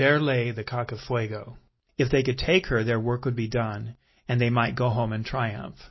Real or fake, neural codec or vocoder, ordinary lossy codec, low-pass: real; none; MP3, 24 kbps; 7.2 kHz